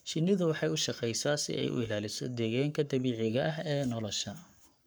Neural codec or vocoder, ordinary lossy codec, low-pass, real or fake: codec, 44.1 kHz, 7.8 kbps, Pupu-Codec; none; none; fake